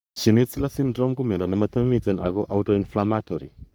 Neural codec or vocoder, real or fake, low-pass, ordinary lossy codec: codec, 44.1 kHz, 3.4 kbps, Pupu-Codec; fake; none; none